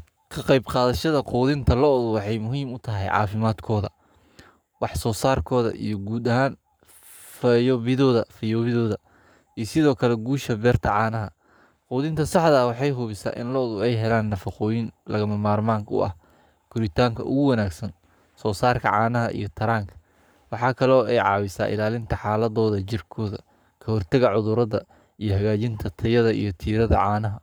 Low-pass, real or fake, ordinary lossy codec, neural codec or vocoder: none; fake; none; codec, 44.1 kHz, 7.8 kbps, Pupu-Codec